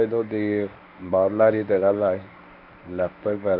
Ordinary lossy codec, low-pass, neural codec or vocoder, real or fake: MP3, 48 kbps; 5.4 kHz; codec, 24 kHz, 0.9 kbps, WavTokenizer, medium speech release version 1; fake